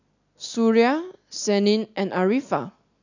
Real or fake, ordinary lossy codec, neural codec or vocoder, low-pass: real; none; none; 7.2 kHz